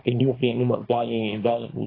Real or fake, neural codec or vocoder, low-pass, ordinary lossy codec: fake; codec, 24 kHz, 0.9 kbps, WavTokenizer, small release; 5.4 kHz; AAC, 24 kbps